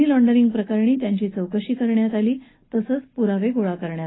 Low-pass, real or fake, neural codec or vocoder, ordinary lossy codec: 7.2 kHz; real; none; AAC, 16 kbps